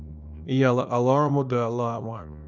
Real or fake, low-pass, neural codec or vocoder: fake; 7.2 kHz; codec, 24 kHz, 0.9 kbps, WavTokenizer, small release